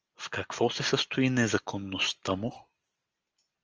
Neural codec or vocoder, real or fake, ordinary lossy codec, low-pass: none; real; Opus, 24 kbps; 7.2 kHz